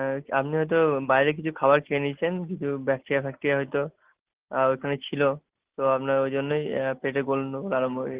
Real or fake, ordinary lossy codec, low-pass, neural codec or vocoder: real; Opus, 16 kbps; 3.6 kHz; none